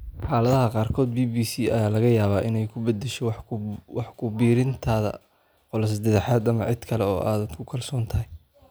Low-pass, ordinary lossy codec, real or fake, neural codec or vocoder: none; none; real; none